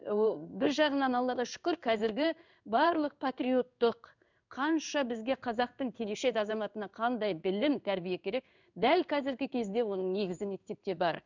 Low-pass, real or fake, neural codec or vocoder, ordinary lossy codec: 7.2 kHz; fake; codec, 16 kHz in and 24 kHz out, 1 kbps, XY-Tokenizer; none